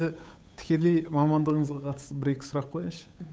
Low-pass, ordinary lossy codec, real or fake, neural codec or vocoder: none; none; fake; codec, 16 kHz, 8 kbps, FunCodec, trained on Chinese and English, 25 frames a second